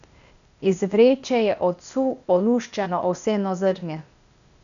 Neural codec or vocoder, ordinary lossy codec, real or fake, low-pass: codec, 16 kHz, 0.8 kbps, ZipCodec; none; fake; 7.2 kHz